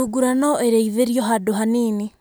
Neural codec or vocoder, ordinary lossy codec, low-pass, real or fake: none; none; none; real